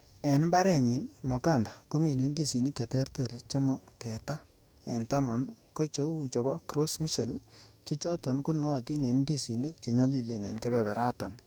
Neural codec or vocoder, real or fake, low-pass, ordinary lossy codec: codec, 44.1 kHz, 2.6 kbps, DAC; fake; none; none